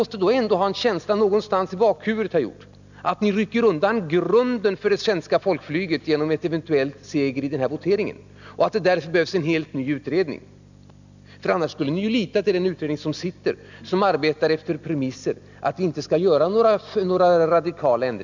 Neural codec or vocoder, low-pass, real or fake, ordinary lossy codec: none; 7.2 kHz; real; none